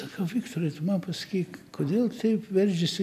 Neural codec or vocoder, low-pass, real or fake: none; 14.4 kHz; real